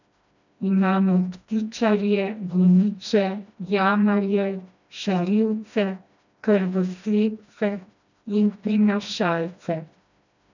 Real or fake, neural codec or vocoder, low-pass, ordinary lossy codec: fake; codec, 16 kHz, 1 kbps, FreqCodec, smaller model; 7.2 kHz; none